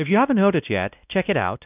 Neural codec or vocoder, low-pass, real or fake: codec, 16 kHz, 1 kbps, X-Codec, WavLM features, trained on Multilingual LibriSpeech; 3.6 kHz; fake